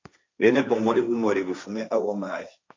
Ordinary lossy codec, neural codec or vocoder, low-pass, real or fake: MP3, 64 kbps; codec, 16 kHz, 1.1 kbps, Voila-Tokenizer; 7.2 kHz; fake